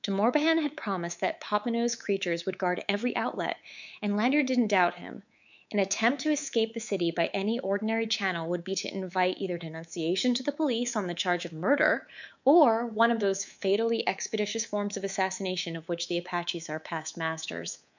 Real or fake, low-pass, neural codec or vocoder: fake; 7.2 kHz; codec, 24 kHz, 3.1 kbps, DualCodec